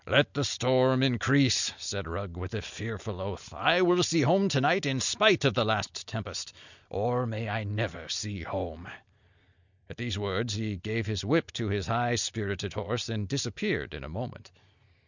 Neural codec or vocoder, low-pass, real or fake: none; 7.2 kHz; real